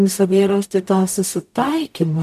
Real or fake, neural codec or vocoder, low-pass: fake; codec, 44.1 kHz, 0.9 kbps, DAC; 14.4 kHz